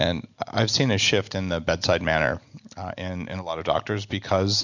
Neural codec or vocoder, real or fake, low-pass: none; real; 7.2 kHz